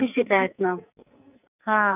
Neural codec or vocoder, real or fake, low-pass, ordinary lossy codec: codec, 44.1 kHz, 2.6 kbps, SNAC; fake; 3.6 kHz; none